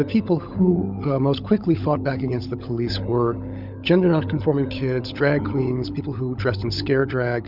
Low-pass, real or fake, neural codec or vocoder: 5.4 kHz; fake; codec, 16 kHz, 16 kbps, FunCodec, trained on LibriTTS, 50 frames a second